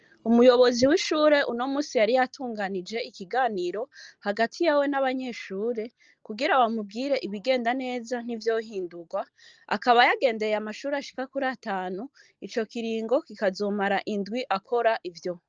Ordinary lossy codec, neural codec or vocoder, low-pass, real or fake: Opus, 24 kbps; none; 7.2 kHz; real